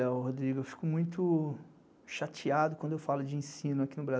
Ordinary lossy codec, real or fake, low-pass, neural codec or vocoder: none; real; none; none